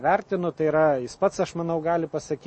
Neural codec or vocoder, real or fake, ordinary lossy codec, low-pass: none; real; MP3, 32 kbps; 9.9 kHz